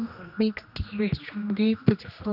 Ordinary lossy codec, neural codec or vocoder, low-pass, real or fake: none; codec, 16 kHz, 1 kbps, X-Codec, HuBERT features, trained on general audio; 5.4 kHz; fake